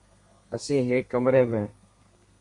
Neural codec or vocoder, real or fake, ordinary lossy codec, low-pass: codec, 32 kHz, 1.9 kbps, SNAC; fake; MP3, 48 kbps; 10.8 kHz